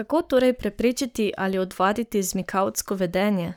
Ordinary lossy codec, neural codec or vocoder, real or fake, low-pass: none; codec, 44.1 kHz, 7.8 kbps, Pupu-Codec; fake; none